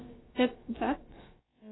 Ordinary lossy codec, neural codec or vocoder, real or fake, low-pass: AAC, 16 kbps; codec, 16 kHz, about 1 kbps, DyCAST, with the encoder's durations; fake; 7.2 kHz